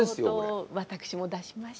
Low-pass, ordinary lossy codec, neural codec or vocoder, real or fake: none; none; none; real